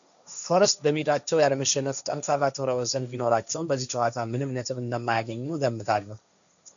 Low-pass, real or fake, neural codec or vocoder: 7.2 kHz; fake; codec, 16 kHz, 1.1 kbps, Voila-Tokenizer